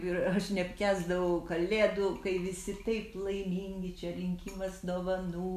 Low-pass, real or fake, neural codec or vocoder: 14.4 kHz; real; none